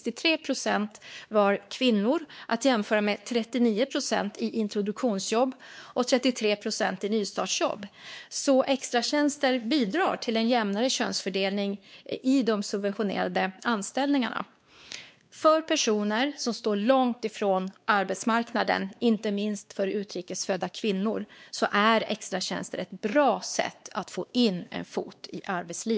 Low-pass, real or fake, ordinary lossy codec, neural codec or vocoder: none; fake; none; codec, 16 kHz, 2 kbps, X-Codec, WavLM features, trained on Multilingual LibriSpeech